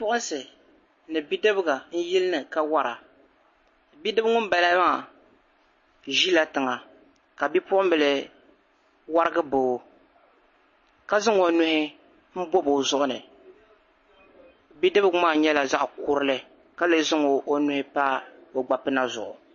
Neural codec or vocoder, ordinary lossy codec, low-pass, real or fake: none; MP3, 32 kbps; 7.2 kHz; real